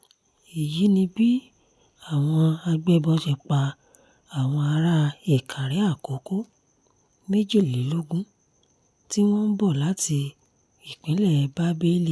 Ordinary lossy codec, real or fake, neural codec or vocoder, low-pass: none; real; none; none